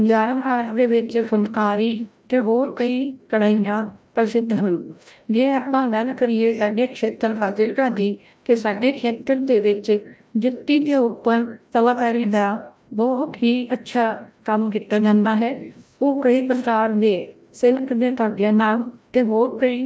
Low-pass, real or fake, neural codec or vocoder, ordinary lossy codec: none; fake; codec, 16 kHz, 0.5 kbps, FreqCodec, larger model; none